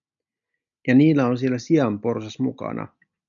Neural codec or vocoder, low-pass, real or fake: none; 7.2 kHz; real